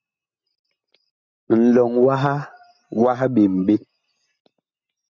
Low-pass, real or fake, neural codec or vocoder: 7.2 kHz; real; none